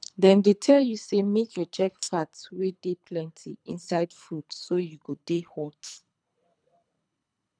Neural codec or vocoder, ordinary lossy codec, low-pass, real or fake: codec, 24 kHz, 3 kbps, HILCodec; none; 9.9 kHz; fake